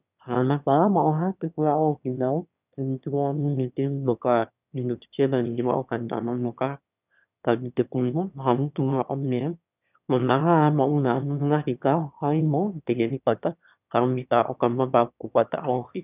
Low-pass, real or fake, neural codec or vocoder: 3.6 kHz; fake; autoencoder, 22.05 kHz, a latent of 192 numbers a frame, VITS, trained on one speaker